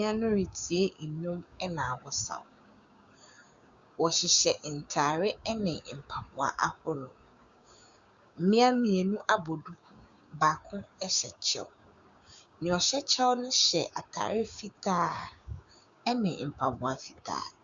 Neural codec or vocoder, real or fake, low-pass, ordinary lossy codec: codec, 16 kHz, 6 kbps, DAC; fake; 7.2 kHz; Opus, 64 kbps